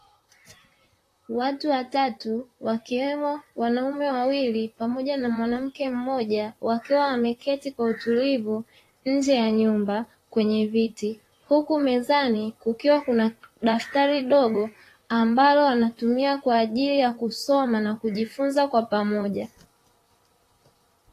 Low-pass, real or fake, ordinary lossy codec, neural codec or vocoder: 14.4 kHz; fake; AAC, 48 kbps; vocoder, 44.1 kHz, 128 mel bands, Pupu-Vocoder